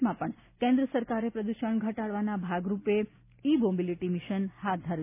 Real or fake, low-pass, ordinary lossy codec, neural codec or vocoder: real; 3.6 kHz; none; none